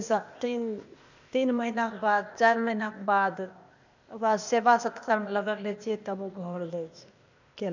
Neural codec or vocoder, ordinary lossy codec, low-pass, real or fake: codec, 16 kHz, 0.8 kbps, ZipCodec; none; 7.2 kHz; fake